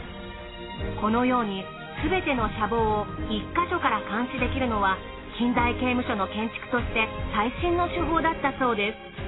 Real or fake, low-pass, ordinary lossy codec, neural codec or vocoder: real; 7.2 kHz; AAC, 16 kbps; none